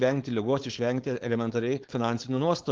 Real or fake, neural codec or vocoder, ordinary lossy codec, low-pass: fake; codec, 16 kHz, 4.8 kbps, FACodec; Opus, 16 kbps; 7.2 kHz